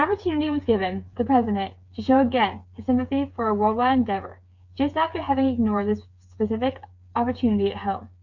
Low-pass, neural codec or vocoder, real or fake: 7.2 kHz; codec, 16 kHz, 8 kbps, FreqCodec, smaller model; fake